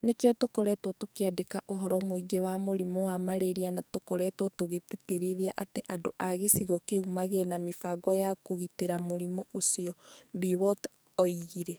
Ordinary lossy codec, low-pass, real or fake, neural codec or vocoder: none; none; fake; codec, 44.1 kHz, 2.6 kbps, SNAC